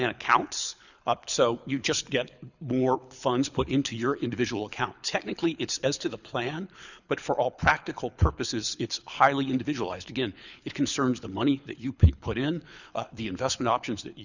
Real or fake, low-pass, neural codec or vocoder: fake; 7.2 kHz; codec, 24 kHz, 6 kbps, HILCodec